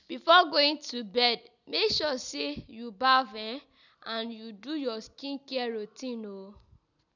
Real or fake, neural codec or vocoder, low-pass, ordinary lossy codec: real; none; 7.2 kHz; none